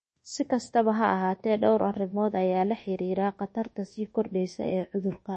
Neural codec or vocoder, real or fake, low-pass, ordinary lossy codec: codec, 24 kHz, 1.2 kbps, DualCodec; fake; 10.8 kHz; MP3, 32 kbps